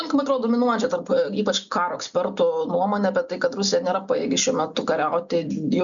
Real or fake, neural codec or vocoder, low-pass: real; none; 7.2 kHz